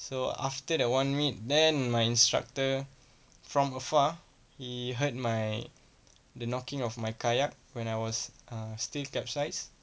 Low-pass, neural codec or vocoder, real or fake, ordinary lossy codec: none; none; real; none